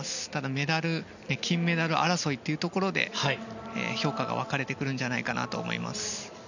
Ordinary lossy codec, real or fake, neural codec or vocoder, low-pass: none; real; none; 7.2 kHz